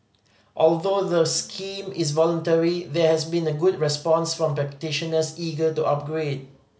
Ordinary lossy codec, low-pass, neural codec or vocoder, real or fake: none; none; none; real